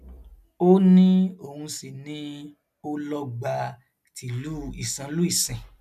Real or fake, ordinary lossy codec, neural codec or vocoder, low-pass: real; none; none; 14.4 kHz